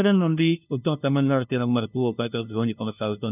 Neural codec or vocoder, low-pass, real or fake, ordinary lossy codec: codec, 16 kHz, 0.5 kbps, FunCodec, trained on LibriTTS, 25 frames a second; 3.6 kHz; fake; none